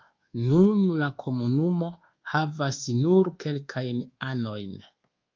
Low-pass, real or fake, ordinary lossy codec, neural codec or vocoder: 7.2 kHz; fake; Opus, 32 kbps; codec, 24 kHz, 1.2 kbps, DualCodec